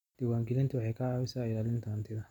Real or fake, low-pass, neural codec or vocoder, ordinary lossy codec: real; 19.8 kHz; none; none